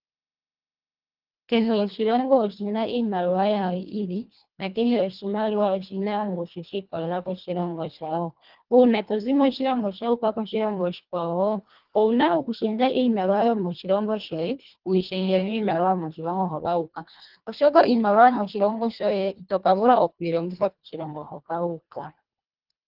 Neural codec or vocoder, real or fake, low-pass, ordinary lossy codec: codec, 24 kHz, 1.5 kbps, HILCodec; fake; 5.4 kHz; Opus, 32 kbps